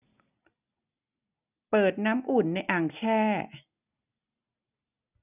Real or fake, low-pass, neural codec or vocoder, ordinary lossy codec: real; 3.6 kHz; none; none